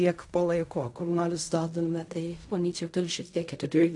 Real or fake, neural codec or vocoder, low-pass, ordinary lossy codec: fake; codec, 16 kHz in and 24 kHz out, 0.4 kbps, LongCat-Audio-Codec, fine tuned four codebook decoder; 10.8 kHz; AAC, 64 kbps